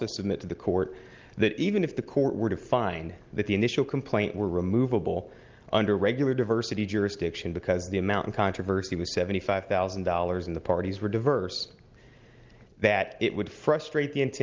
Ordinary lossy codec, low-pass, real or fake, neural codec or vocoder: Opus, 32 kbps; 7.2 kHz; real; none